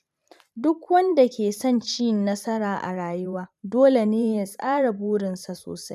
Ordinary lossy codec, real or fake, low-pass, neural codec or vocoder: none; fake; 14.4 kHz; vocoder, 44.1 kHz, 128 mel bands every 512 samples, BigVGAN v2